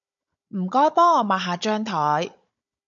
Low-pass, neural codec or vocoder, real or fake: 7.2 kHz; codec, 16 kHz, 16 kbps, FunCodec, trained on Chinese and English, 50 frames a second; fake